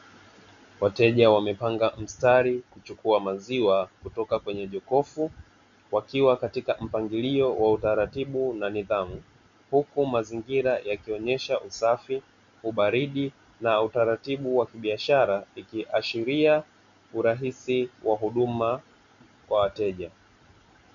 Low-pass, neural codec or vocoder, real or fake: 7.2 kHz; none; real